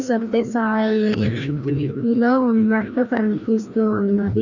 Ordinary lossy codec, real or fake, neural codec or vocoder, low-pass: none; fake; codec, 16 kHz, 1 kbps, FreqCodec, larger model; 7.2 kHz